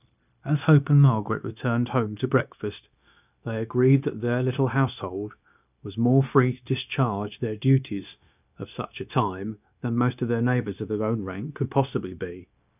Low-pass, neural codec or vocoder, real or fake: 3.6 kHz; codec, 16 kHz, 0.9 kbps, LongCat-Audio-Codec; fake